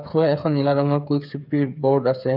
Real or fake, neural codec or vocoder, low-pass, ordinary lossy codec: fake; codec, 16 kHz, 8 kbps, FreqCodec, smaller model; 5.4 kHz; none